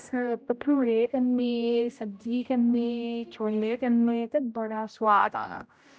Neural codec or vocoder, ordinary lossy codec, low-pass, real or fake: codec, 16 kHz, 0.5 kbps, X-Codec, HuBERT features, trained on general audio; none; none; fake